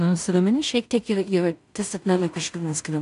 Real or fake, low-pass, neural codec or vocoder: fake; 10.8 kHz; codec, 16 kHz in and 24 kHz out, 0.4 kbps, LongCat-Audio-Codec, two codebook decoder